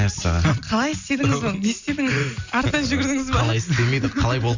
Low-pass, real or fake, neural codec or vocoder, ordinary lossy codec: 7.2 kHz; real; none; Opus, 64 kbps